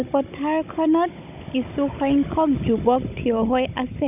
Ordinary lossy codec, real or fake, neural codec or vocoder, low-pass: none; fake; codec, 16 kHz, 16 kbps, FunCodec, trained on Chinese and English, 50 frames a second; 3.6 kHz